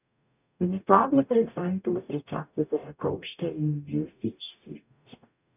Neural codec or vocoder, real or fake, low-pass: codec, 44.1 kHz, 0.9 kbps, DAC; fake; 3.6 kHz